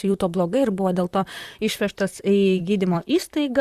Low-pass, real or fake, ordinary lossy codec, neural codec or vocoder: 14.4 kHz; fake; Opus, 64 kbps; vocoder, 44.1 kHz, 128 mel bands, Pupu-Vocoder